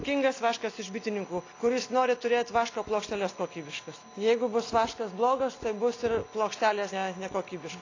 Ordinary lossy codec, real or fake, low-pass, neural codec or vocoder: AAC, 32 kbps; real; 7.2 kHz; none